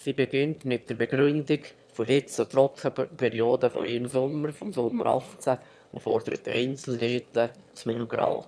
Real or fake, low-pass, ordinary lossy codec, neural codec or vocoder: fake; none; none; autoencoder, 22.05 kHz, a latent of 192 numbers a frame, VITS, trained on one speaker